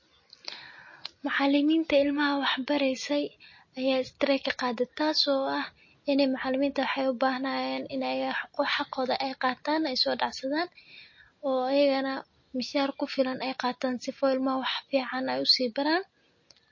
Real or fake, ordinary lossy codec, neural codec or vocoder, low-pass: real; MP3, 32 kbps; none; 7.2 kHz